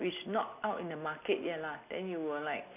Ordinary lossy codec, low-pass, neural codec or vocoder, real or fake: none; 3.6 kHz; none; real